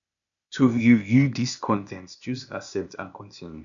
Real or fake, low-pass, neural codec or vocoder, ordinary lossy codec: fake; 7.2 kHz; codec, 16 kHz, 0.8 kbps, ZipCodec; none